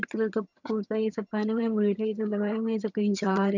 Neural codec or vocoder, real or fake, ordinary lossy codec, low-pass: vocoder, 22.05 kHz, 80 mel bands, HiFi-GAN; fake; none; 7.2 kHz